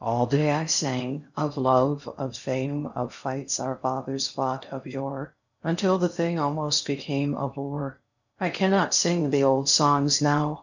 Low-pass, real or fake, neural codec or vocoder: 7.2 kHz; fake; codec, 16 kHz in and 24 kHz out, 0.6 kbps, FocalCodec, streaming, 4096 codes